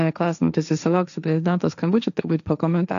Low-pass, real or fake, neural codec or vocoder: 7.2 kHz; fake; codec, 16 kHz, 1.1 kbps, Voila-Tokenizer